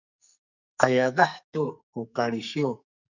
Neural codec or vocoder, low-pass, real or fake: codec, 44.1 kHz, 2.6 kbps, SNAC; 7.2 kHz; fake